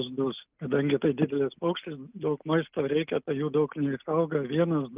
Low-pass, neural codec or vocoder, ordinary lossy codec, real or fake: 3.6 kHz; none; Opus, 32 kbps; real